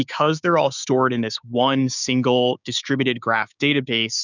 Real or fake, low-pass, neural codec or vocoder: fake; 7.2 kHz; codec, 16 kHz, 6 kbps, DAC